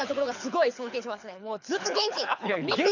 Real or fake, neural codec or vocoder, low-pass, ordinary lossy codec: fake; codec, 24 kHz, 6 kbps, HILCodec; 7.2 kHz; none